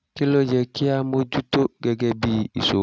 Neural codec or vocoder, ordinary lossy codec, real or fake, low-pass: none; none; real; none